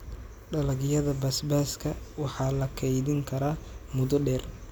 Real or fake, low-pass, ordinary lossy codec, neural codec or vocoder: real; none; none; none